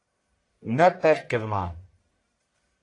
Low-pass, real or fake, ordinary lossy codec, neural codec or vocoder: 10.8 kHz; fake; AAC, 48 kbps; codec, 44.1 kHz, 1.7 kbps, Pupu-Codec